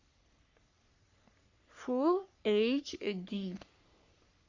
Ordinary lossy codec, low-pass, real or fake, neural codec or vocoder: Opus, 64 kbps; 7.2 kHz; fake; codec, 44.1 kHz, 3.4 kbps, Pupu-Codec